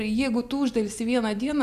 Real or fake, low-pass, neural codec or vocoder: real; 14.4 kHz; none